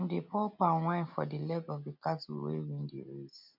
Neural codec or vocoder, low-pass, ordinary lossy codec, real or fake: none; 5.4 kHz; none; real